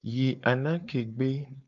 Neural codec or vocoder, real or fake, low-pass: codec, 16 kHz, 4.8 kbps, FACodec; fake; 7.2 kHz